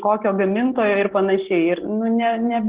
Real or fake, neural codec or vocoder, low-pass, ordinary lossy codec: real; none; 3.6 kHz; Opus, 16 kbps